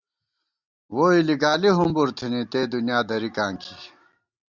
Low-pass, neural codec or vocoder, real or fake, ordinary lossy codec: 7.2 kHz; none; real; Opus, 64 kbps